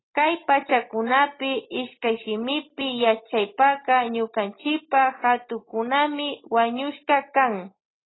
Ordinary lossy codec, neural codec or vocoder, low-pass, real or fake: AAC, 16 kbps; none; 7.2 kHz; real